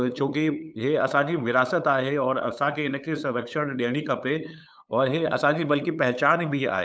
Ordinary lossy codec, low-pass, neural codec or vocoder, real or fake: none; none; codec, 16 kHz, 8 kbps, FunCodec, trained on LibriTTS, 25 frames a second; fake